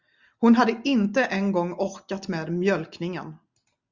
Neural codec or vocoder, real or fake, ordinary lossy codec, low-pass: none; real; Opus, 64 kbps; 7.2 kHz